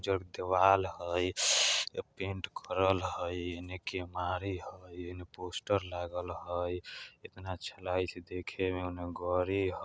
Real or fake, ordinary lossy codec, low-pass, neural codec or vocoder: real; none; none; none